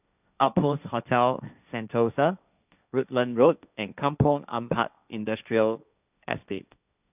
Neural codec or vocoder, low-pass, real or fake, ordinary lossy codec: codec, 16 kHz, 1.1 kbps, Voila-Tokenizer; 3.6 kHz; fake; none